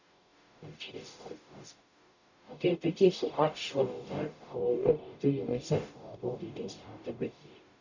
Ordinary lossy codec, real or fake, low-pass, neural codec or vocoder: none; fake; 7.2 kHz; codec, 44.1 kHz, 0.9 kbps, DAC